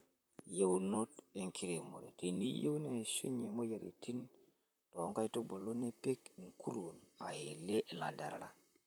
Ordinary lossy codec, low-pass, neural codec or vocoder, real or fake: none; none; vocoder, 44.1 kHz, 128 mel bands, Pupu-Vocoder; fake